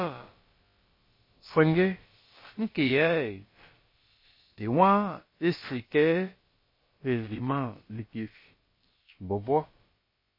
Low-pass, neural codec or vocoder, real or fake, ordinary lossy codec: 5.4 kHz; codec, 16 kHz, about 1 kbps, DyCAST, with the encoder's durations; fake; MP3, 24 kbps